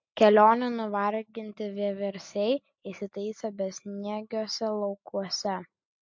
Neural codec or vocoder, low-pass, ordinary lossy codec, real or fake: none; 7.2 kHz; MP3, 48 kbps; real